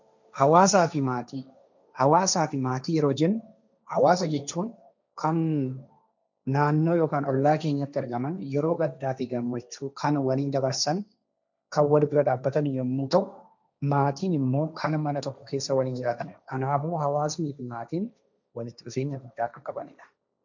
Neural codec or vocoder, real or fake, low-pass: codec, 16 kHz, 1.1 kbps, Voila-Tokenizer; fake; 7.2 kHz